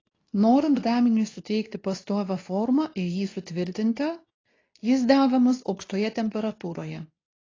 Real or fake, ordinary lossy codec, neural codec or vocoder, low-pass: fake; AAC, 32 kbps; codec, 24 kHz, 0.9 kbps, WavTokenizer, medium speech release version 2; 7.2 kHz